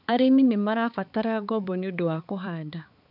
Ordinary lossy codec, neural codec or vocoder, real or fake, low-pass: none; codec, 16 kHz, 4 kbps, X-Codec, HuBERT features, trained on balanced general audio; fake; 5.4 kHz